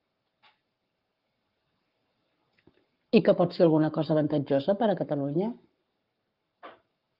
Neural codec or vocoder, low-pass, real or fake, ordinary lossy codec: codec, 44.1 kHz, 7.8 kbps, Pupu-Codec; 5.4 kHz; fake; Opus, 24 kbps